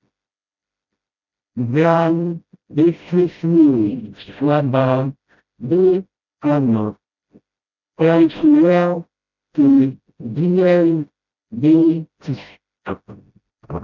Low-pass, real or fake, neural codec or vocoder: 7.2 kHz; fake; codec, 16 kHz, 0.5 kbps, FreqCodec, smaller model